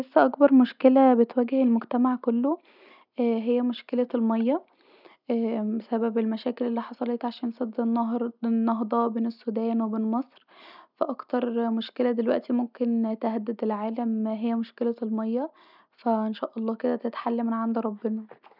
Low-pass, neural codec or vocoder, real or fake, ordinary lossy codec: 5.4 kHz; none; real; none